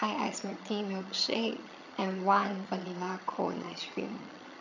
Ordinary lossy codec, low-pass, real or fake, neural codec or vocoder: none; 7.2 kHz; fake; vocoder, 22.05 kHz, 80 mel bands, HiFi-GAN